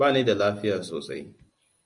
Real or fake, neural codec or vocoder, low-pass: real; none; 10.8 kHz